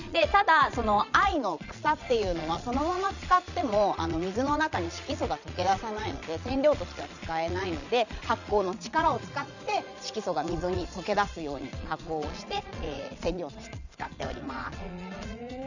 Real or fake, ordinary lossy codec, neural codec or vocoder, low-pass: fake; none; vocoder, 22.05 kHz, 80 mel bands, Vocos; 7.2 kHz